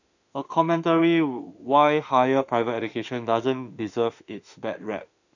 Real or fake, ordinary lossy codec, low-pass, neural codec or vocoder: fake; none; 7.2 kHz; autoencoder, 48 kHz, 32 numbers a frame, DAC-VAE, trained on Japanese speech